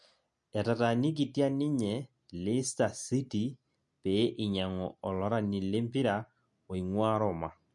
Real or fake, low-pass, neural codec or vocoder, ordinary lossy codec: real; 10.8 kHz; none; MP3, 48 kbps